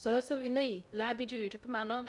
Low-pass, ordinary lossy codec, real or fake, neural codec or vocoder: 10.8 kHz; none; fake; codec, 16 kHz in and 24 kHz out, 0.6 kbps, FocalCodec, streaming, 2048 codes